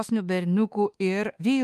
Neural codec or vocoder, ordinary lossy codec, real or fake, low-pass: autoencoder, 48 kHz, 32 numbers a frame, DAC-VAE, trained on Japanese speech; Opus, 32 kbps; fake; 14.4 kHz